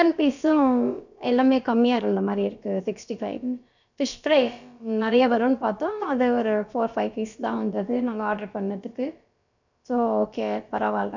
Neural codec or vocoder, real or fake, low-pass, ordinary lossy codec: codec, 16 kHz, about 1 kbps, DyCAST, with the encoder's durations; fake; 7.2 kHz; none